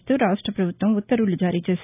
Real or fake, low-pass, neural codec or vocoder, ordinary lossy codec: real; 3.6 kHz; none; none